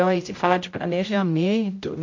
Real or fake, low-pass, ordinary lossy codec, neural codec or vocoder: fake; 7.2 kHz; MP3, 48 kbps; codec, 16 kHz, 0.5 kbps, X-Codec, HuBERT features, trained on general audio